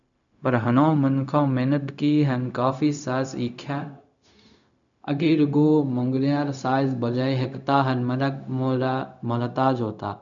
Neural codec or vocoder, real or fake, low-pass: codec, 16 kHz, 0.4 kbps, LongCat-Audio-Codec; fake; 7.2 kHz